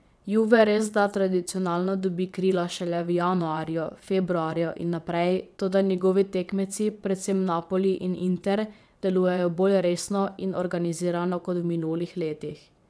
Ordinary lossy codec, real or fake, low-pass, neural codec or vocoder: none; fake; none; vocoder, 22.05 kHz, 80 mel bands, WaveNeXt